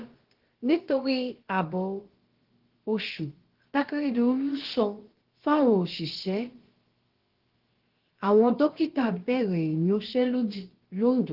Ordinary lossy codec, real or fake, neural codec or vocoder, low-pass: Opus, 16 kbps; fake; codec, 16 kHz, about 1 kbps, DyCAST, with the encoder's durations; 5.4 kHz